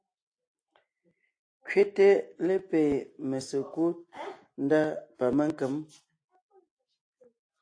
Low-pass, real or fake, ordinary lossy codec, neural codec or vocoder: 9.9 kHz; real; AAC, 48 kbps; none